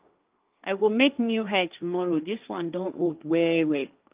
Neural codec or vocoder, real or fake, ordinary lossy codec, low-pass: codec, 16 kHz, 1.1 kbps, Voila-Tokenizer; fake; Opus, 24 kbps; 3.6 kHz